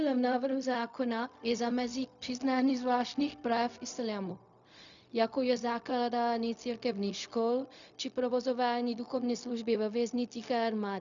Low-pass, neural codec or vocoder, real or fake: 7.2 kHz; codec, 16 kHz, 0.4 kbps, LongCat-Audio-Codec; fake